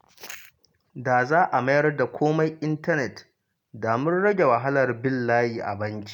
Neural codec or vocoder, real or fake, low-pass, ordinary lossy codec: none; real; 19.8 kHz; none